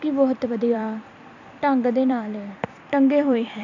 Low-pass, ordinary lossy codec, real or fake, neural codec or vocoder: 7.2 kHz; none; fake; vocoder, 44.1 kHz, 128 mel bands every 256 samples, BigVGAN v2